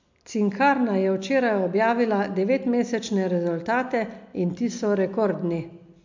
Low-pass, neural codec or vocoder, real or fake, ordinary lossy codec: 7.2 kHz; none; real; MP3, 64 kbps